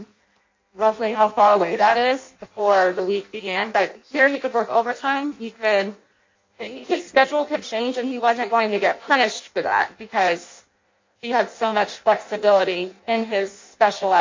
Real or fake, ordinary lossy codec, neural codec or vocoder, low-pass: fake; MP3, 48 kbps; codec, 16 kHz in and 24 kHz out, 0.6 kbps, FireRedTTS-2 codec; 7.2 kHz